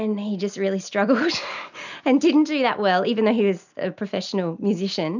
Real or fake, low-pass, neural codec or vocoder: real; 7.2 kHz; none